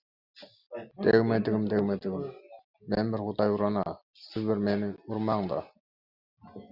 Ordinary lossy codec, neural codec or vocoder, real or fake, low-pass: Opus, 64 kbps; none; real; 5.4 kHz